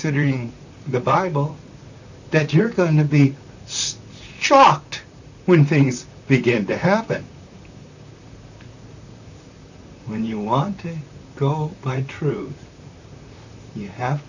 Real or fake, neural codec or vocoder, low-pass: fake; vocoder, 44.1 kHz, 128 mel bands, Pupu-Vocoder; 7.2 kHz